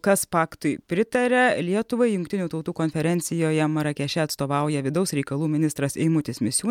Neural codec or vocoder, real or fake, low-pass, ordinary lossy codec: none; real; 19.8 kHz; MP3, 96 kbps